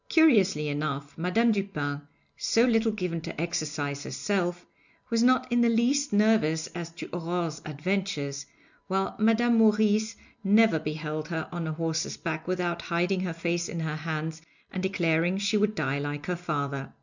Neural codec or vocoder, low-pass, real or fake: none; 7.2 kHz; real